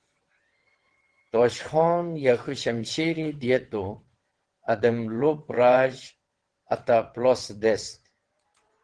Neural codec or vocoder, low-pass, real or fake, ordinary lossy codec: vocoder, 22.05 kHz, 80 mel bands, WaveNeXt; 9.9 kHz; fake; Opus, 16 kbps